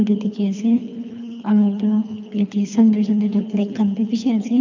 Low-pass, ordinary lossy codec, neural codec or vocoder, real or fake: 7.2 kHz; none; codec, 24 kHz, 3 kbps, HILCodec; fake